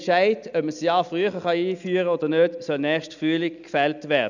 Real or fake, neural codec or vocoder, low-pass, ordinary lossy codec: real; none; 7.2 kHz; none